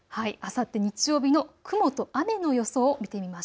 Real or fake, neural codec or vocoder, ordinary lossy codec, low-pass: real; none; none; none